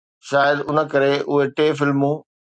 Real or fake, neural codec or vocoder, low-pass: real; none; 9.9 kHz